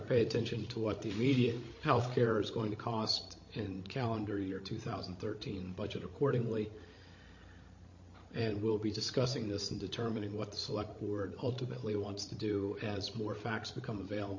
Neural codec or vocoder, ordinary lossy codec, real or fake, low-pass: codec, 16 kHz, 16 kbps, FreqCodec, larger model; MP3, 32 kbps; fake; 7.2 kHz